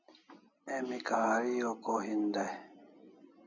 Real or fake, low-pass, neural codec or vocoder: real; 7.2 kHz; none